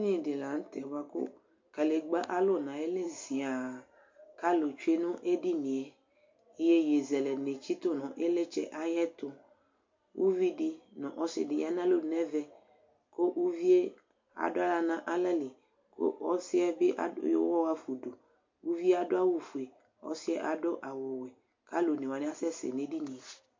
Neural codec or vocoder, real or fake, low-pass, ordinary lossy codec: none; real; 7.2 kHz; MP3, 48 kbps